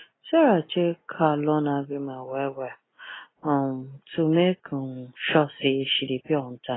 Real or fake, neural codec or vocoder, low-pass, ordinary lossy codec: real; none; 7.2 kHz; AAC, 16 kbps